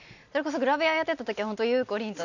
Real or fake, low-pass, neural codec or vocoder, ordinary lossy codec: real; 7.2 kHz; none; none